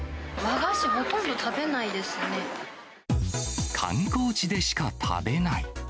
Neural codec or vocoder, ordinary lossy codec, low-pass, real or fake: none; none; none; real